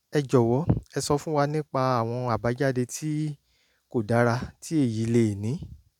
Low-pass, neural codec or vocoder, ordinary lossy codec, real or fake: 19.8 kHz; none; none; real